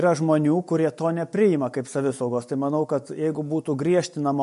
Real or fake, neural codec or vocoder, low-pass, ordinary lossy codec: real; none; 10.8 kHz; MP3, 48 kbps